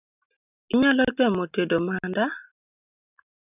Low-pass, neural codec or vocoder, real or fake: 3.6 kHz; none; real